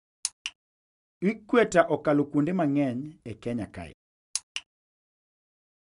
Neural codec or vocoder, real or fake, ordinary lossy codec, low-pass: none; real; none; 10.8 kHz